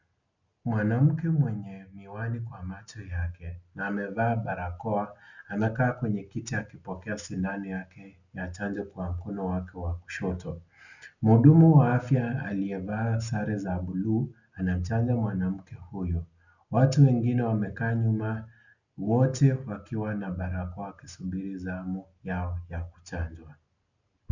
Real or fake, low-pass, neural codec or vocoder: real; 7.2 kHz; none